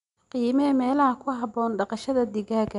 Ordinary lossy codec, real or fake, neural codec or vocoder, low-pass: none; real; none; 10.8 kHz